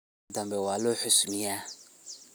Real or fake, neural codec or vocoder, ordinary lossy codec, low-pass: real; none; none; none